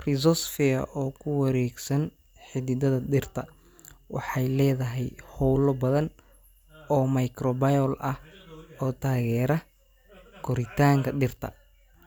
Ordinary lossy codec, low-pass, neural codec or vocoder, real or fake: none; none; none; real